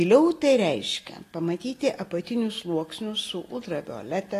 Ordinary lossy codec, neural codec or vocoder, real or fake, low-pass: AAC, 48 kbps; none; real; 14.4 kHz